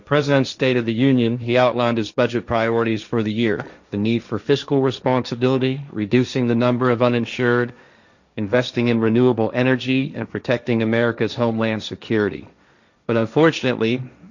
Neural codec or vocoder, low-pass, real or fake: codec, 16 kHz, 1.1 kbps, Voila-Tokenizer; 7.2 kHz; fake